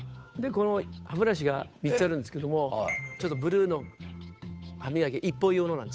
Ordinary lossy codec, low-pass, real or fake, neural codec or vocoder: none; none; fake; codec, 16 kHz, 8 kbps, FunCodec, trained on Chinese and English, 25 frames a second